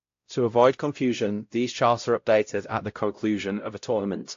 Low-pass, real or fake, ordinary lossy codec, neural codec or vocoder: 7.2 kHz; fake; AAC, 48 kbps; codec, 16 kHz, 0.5 kbps, X-Codec, WavLM features, trained on Multilingual LibriSpeech